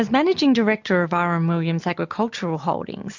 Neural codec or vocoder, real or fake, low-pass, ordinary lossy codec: none; real; 7.2 kHz; MP3, 64 kbps